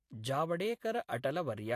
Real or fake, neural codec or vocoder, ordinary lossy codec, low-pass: fake; vocoder, 44.1 kHz, 128 mel bands every 256 samples, BigVGAN v2; AAC, 64 kbps; 14.4 kHz